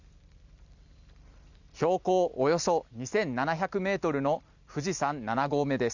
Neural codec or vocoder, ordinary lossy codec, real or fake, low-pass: none; none; real; 7.2 kHz